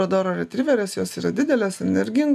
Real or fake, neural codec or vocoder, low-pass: real; none; 14.4 kHz